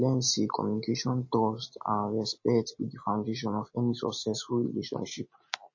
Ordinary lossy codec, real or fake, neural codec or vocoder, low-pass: MP3, 32 kbps; real; none; 7.2 kHz